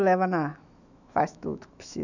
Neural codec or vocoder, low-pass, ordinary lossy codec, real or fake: autoencoder, 48 kHz, 128 numbers a frame, DAC-VAE, trained on Japanese speech; 7.2 kHz; none; fake